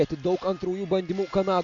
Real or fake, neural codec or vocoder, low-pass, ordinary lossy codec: real; none; 7.2 kHz; AAC, 48 kbps